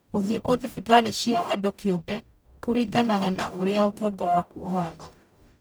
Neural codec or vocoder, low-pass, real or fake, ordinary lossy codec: codec, 44.1 kHz, 0.9 kbps, DAC; none; fake; none